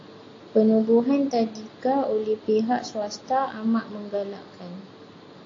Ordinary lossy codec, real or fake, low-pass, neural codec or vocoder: AAC, 32 kbps; real; 7.2 kHz; none